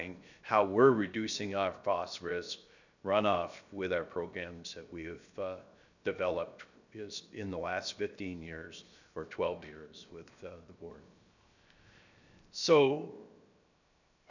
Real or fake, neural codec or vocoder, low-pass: fake; codec, 16 kHz, 0.7 kbps, FocalCodec; 7.2 kHz